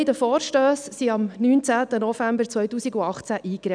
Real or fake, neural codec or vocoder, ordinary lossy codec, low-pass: real; none; none; 9.9 kHz